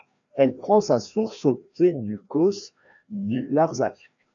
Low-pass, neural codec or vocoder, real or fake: 7.2 kHz; codec, 16 kHz, 1 kbps, FreqCodec, larger model; fake